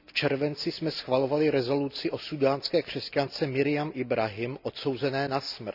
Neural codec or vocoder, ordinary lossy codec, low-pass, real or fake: none; none; 5.4 kHz; real